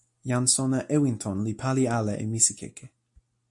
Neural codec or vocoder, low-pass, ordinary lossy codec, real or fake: none; 10.8 kHz; AAC, 64 kbps; real